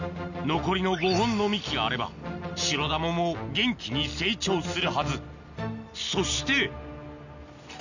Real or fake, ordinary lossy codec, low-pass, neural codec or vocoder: real; none; 7.2 kHz; none